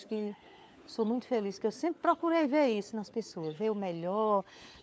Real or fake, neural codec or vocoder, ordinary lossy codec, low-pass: fake; codec, 16 kHz, 4 kbps, FunCodec, trained on LibriTTS, 50 frames a second; none; none